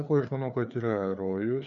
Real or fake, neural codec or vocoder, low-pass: fake; codec, 16 kHz, 4 kbps, FreqCodec, larger model; 7.2 kHz